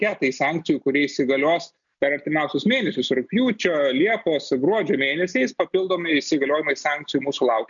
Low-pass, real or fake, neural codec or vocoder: 7.2 kHz; real; none